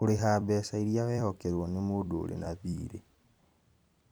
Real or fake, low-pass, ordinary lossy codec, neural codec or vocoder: fake; none; none; vocoder, 44.1 kHz, 128 mel bands every 256 samples, BigVGAN v2